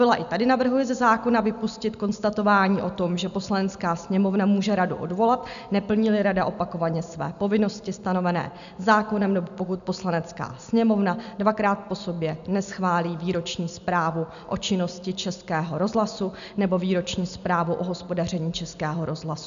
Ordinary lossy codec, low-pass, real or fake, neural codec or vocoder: MP3, 96 kbps; 7.2 kHz; real; none